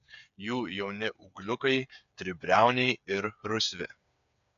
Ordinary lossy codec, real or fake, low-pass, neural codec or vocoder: AAC, 96 kbps; fake; 7.2 kHz; codec, 16 kHz, 8 kbps, FreqCodec, smaller model